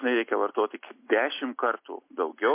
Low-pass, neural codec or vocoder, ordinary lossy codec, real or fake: 3.6 kHz; none; MP3, 24 kbps; real